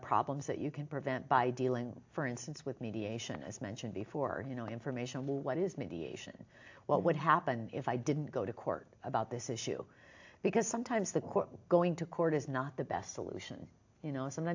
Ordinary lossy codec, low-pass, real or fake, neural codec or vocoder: AAC, 48 kbps; 7.2 kHz; real; none